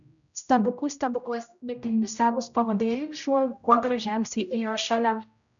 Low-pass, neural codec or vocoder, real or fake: 7.2 kHz; codec, 16 kHz, 0.5 kbps, X-Codec, HuBERT features, trained on general audio; fake